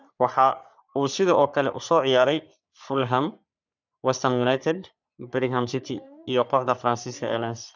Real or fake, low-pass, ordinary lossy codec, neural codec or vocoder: fake; 7.2 kHz; none; codec, 44.1 kHz, 3.4 kbps, Pupu-Codec